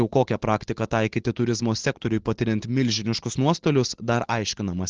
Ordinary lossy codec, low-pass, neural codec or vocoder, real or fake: Opus, 16 kbps; 7.2 kHz; none; real